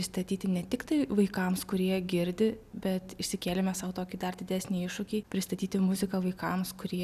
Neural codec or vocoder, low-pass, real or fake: none; 14.4 kHz; real